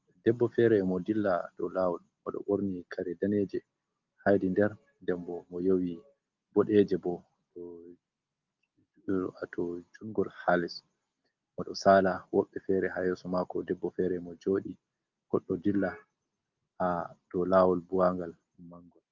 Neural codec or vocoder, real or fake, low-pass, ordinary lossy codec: none; real; 7.2 kHz; Opus, 32 kbps